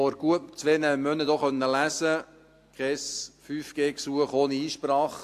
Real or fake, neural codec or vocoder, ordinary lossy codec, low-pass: real; none; AAC, 64 kbps; 14.4 kHz